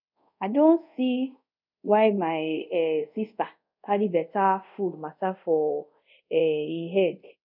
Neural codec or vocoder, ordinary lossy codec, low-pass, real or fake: codec, 24 kHz, 0.5 kbps, DualCodec; none; 5.4 kHz; fake